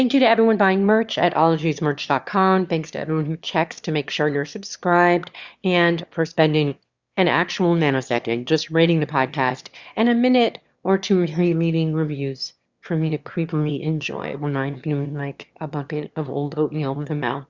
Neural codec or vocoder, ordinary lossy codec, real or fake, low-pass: autoencoder, 22.05 kHz, a latent of 192 numbers a frame, VITS, trained on one speaker; Opus, 64 kbps; fake; 7.2 kHz